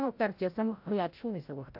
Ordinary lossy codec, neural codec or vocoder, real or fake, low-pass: AAC, 48 kbps; codec, 16 kHz, 0.5 kbps, FreqCodec, larger model; fake; 5.4 kHz